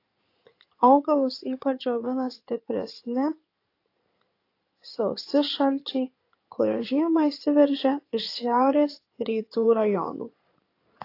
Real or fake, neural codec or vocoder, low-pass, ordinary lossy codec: fake; codec, 16 kHz in and 24 kHz out, 2.2 kbps, FireRedTTS-2 codec; 5.4 kHz; AAC, 32 kbps